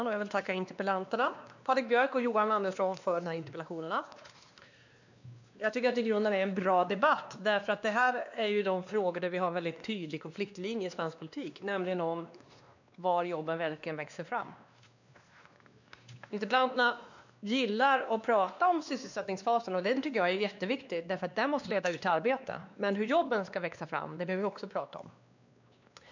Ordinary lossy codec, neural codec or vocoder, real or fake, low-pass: none; codec, 16 kHz, 2 kbps, X-Codec, WavLM features, trained on Multilingual LibriSpeech; fake; 7.2 kHz